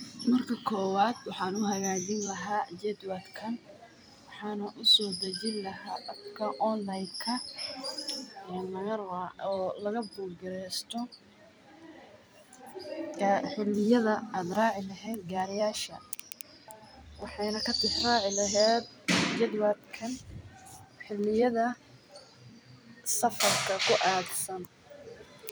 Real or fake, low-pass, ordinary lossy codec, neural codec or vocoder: fake; none; none; vocoder, 44.1 kHz, 128 mel bands every 256 samples, BigVGAN v2